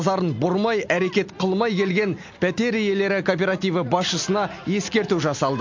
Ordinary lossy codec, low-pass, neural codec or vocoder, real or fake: MP3, 48 kbps; 7.2 kHz; none; real